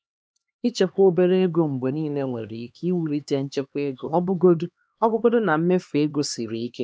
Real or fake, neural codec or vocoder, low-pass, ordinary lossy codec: fake; codec, 16 kHz, 2 kbps, X-Codec, HuBERT features, trained on LibriSpeech; none; none